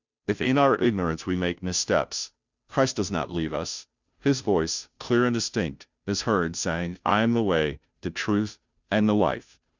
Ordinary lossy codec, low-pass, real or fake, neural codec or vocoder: Opus, 64 kbps; 7.2 kHz; fake; codec, 16 kHz, 0.5 kbps, FunCodec, trained on Chinese and English, 25 frames a second